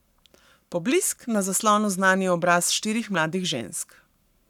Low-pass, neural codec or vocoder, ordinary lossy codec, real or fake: 19.8 kHz; codec, 44.1 kHz, 7.8 kbps, Pupu-Codec; none; fake